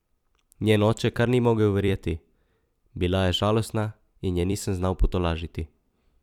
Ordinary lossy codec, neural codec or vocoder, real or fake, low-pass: none; vocoder, 44.1 kHz, 128 mel bands every 256 samples, BigVGAN v2; fake; 19.8 kHz